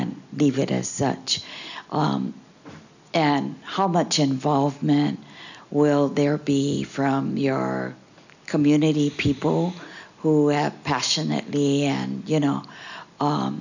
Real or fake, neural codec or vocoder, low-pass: real; none; 7.2 kHz